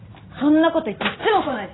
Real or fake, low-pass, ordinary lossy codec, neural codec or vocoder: real; 7.2 kHz; AAC, 16 kbps; none